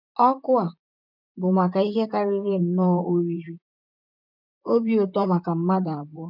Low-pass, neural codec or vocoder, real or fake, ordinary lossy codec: 5.4 kHz; vocoder, 44.1 kHz, 128 mel bands, Pupu-Vocoder; fake; none